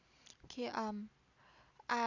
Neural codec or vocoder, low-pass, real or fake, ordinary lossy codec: none; 7.2 kHz; real; none